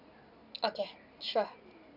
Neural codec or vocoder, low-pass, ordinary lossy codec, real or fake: none; 5.4 kHz; none; real